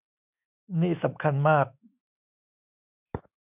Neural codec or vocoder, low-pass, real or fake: autoencoder, 48 kHz, 128 numbers a frame, DAC-VAE, trained on Japanese speech; 3.6 kHz; fake